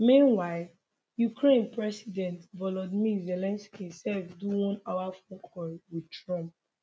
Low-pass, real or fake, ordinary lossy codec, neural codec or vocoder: none; real; none; none